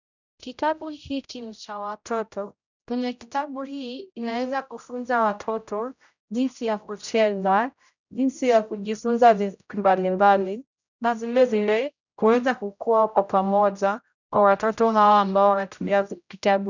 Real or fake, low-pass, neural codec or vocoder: fake; 7.2 kHz; codec, 16 kHz, 0.5 kbps, X-Codec, HuBERT features, trained on general audio